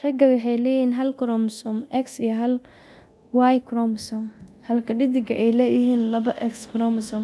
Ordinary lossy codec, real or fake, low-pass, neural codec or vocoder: none; fake; none; codec, 24 kHz, 0.9 kbps, DualCodec